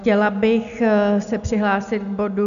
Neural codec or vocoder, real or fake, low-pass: none; real; 7.2 kHz